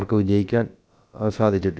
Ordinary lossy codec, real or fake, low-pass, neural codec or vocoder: none; fake; none; codec, 16 kHz, about 1 kbps, DyCAST, with the encoder's durations